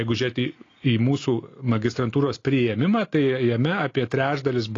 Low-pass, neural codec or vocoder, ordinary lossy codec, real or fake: 7.2 kHz; none; AAC, 32 kbps; real